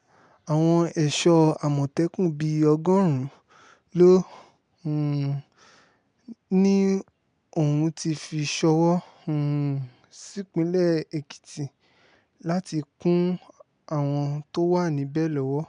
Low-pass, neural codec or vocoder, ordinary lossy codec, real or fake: 9.9 kHz; none; none; real